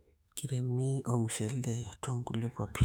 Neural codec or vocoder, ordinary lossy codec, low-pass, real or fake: autoencoder, 48 kHz, 32 numbers a frame, DAC-VAE, trained on Japanese speech; none; 19.8 kHz; fake